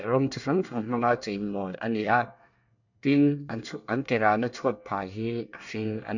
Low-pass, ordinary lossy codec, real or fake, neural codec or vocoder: 7.2 kHz; none; fake; codec, 24 kHz, 1 kbps, SNAC